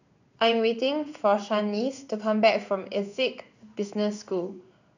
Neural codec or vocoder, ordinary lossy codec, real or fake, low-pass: codec, 16 kHz in and 24 kHz out, 1 kbps, XY-Tokenizer; none; fake; 7.2 kHz